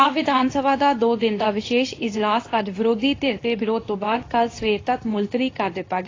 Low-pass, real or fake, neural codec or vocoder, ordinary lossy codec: 7.2 kHz; fake; codec, 24 kHz, 0.9 kbps, WavTokenizer, medium speech release version 2; AAC, 32 kbps